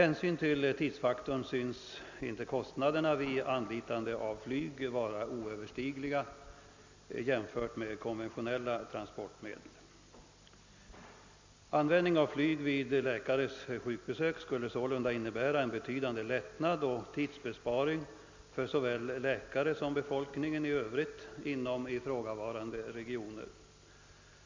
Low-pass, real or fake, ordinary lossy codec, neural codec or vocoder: 7.2 kHz; real; MP3, 64 kbps; none